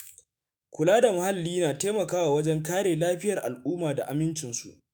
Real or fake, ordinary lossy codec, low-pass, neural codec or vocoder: fake; none; none; autoencoder, 48 kHz, 128 numbers a frame, DAC-VAE, trained on Japanese speech